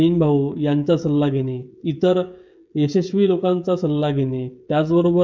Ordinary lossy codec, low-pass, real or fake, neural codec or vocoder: MP3, 64 kbps; 7.2 kHz; fake; codec, 16 kHz, 16 kbps, FreqCodec, smaller model